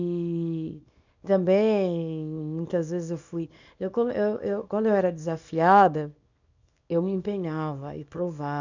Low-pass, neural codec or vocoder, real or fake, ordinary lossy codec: 7.2 kHz; codec, 24 kHz, 0.9 kbps, WavTokenizer, small release; fake; none